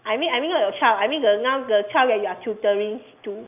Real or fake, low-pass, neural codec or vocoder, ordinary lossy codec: real; 3.6 kHz; none; none